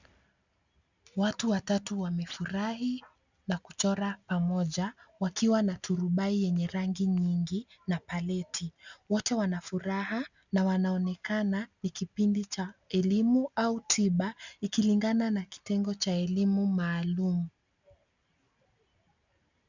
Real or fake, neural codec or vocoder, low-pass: real; none; 7.2 kHz